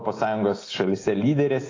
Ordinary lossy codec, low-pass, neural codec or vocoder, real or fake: AAC, 32 kbps; 7.2 kHz; none; real